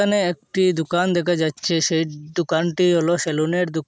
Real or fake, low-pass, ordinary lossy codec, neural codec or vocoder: real; none; none; none